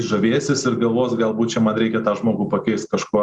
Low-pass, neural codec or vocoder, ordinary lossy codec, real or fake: 10.8 kHz; none; Opus, 64 kbps; real